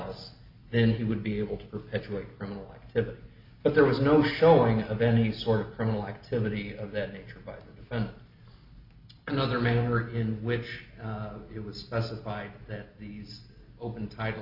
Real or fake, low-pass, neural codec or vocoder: real; 5.4 kHz; none